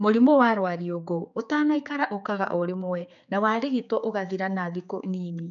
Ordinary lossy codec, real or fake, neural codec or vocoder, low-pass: none; fake; codec, 16 kHz, 4 kbps, X-Codec, HuBERT features, trained on general audio; 7.2 kHz